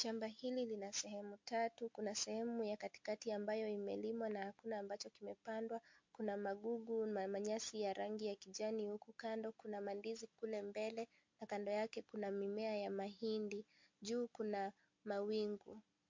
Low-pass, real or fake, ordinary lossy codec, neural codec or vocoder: 7.2 kHz; real; MP3, 48 kbps; none